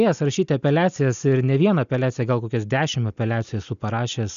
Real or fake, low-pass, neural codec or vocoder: real; 7.2 kHz; none